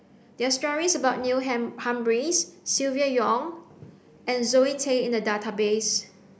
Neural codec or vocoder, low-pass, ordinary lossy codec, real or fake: none; none; none; real